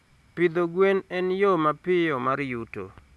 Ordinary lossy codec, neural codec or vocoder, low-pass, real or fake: none; none; none; real